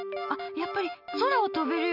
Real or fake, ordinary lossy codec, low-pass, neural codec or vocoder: real; none; 5.4 kHz; none